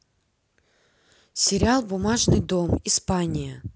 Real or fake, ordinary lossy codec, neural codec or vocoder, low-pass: real; none; none; none